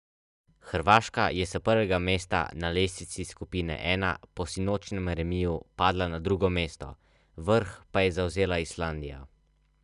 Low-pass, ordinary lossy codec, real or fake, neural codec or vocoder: 10.8 kHz; none; real; none